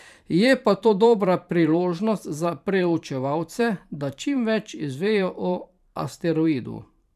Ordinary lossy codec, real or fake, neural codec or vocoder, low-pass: none; fake; vocoder, 44.1 kHz, 128 mel bands every 512 samples, BigVGAN v2; 14.4 kHz